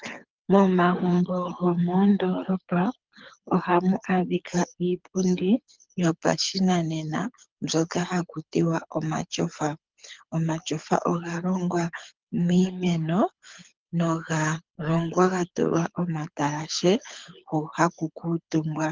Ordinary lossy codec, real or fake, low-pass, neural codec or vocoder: Opus, 24 kbps; fake; 7.2 kHz; codec, 24 kHz, 6 kbps, HILCodec